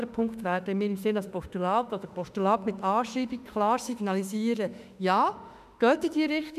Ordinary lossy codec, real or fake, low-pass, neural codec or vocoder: none; fake; 14.4 kHz; autoencoder, 48 kHz, 32 numbers a frame, DAC-VAE, trained on Japanese speech